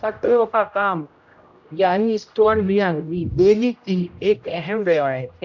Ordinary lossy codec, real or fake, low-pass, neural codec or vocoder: none; fake; 7.2 kHz; codec, 16 kHz, 0.5 kbps, X-Codec, HuBERT features, trained on general audio